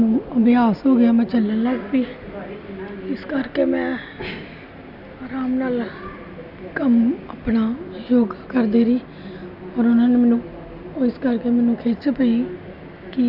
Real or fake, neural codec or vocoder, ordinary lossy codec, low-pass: real; none; none; 5.4 kHz